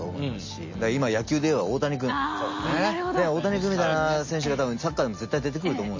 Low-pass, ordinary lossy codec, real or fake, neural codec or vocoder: 7.2 kHz; MP3, 32 kbps; real; none